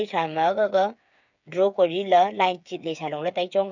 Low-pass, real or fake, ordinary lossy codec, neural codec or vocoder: 7.2 kHz; fake; none; codec, 16 kHz, 8 kbps, FreqCodec, smaller model